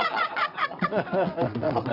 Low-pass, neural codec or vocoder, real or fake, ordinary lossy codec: 5.4 kHz; vocoder, 22.05 kHz, 80 mel bands, WaveNeXt; fake; none